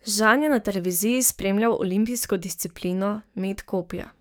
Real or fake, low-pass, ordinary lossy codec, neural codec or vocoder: fake; none; none; codec, 44.1 kHz, 7.8 kbps, DAC